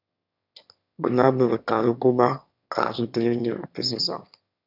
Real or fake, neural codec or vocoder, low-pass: fake; autoencoder, 22.05 kHz, a latent of 192 numbers a frame, VITS, trained on one speaker; 5.4 kHz